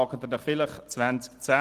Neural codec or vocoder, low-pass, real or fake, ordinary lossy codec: none; 14.4 kHz; real; Opus, 24 kbps